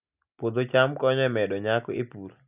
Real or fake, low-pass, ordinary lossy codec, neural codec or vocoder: real; 3.6 kHz; none; none